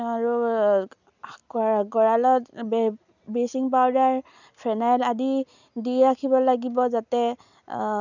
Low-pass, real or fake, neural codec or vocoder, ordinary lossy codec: 7.2 kHz; real; none; none